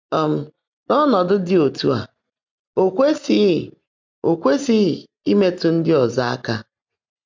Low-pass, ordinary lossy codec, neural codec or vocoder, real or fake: 7.2 kHz; MP3, 64 kbps; none; real